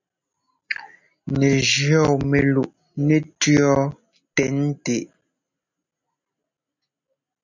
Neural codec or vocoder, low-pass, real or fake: none; 7.2 kHz; real